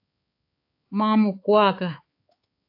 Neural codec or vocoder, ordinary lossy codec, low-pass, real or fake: codec, 16 kHz, 4 kbps, X-Codec, HuBERT features, trained on balanced general audio; AAC, 32 kbps; 5.4 kHz; fake